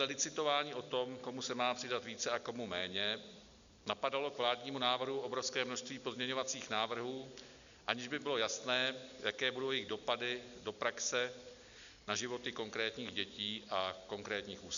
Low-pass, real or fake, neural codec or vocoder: 7.2 kHz; real; none